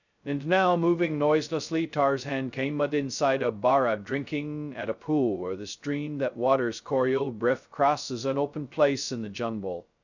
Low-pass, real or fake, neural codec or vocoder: 7.2 kHz; fake; codec, 16 kHz, 0.2 kbps, FocalCodec